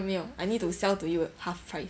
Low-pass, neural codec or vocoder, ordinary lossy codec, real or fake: none; none; none; real